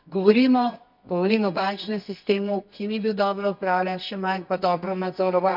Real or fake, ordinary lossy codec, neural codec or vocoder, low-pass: fake; none; codec, 24 kHz, 0.9 kbps, WavTokenizer, medium music audio release; 5.4 kHz